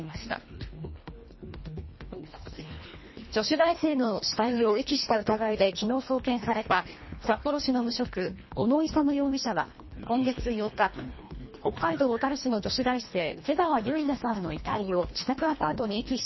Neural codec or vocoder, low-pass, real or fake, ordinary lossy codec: codec, 24 kHz, 1.5 kbps, HILCodec; 7.2 kHz; fake; MP3, 24 kbps